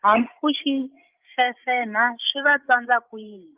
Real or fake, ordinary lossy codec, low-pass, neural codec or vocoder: fake; Opus, 24 kbps; 3.6 kHz; codec, 16 kHz, 16 kbps, FreqCodec, larger model